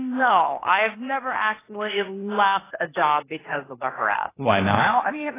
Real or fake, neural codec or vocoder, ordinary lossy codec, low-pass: fake; codec, 16 kHz, 0.8 kbps, ZipCodec; AAC, 16 kbps; 3.6 kHz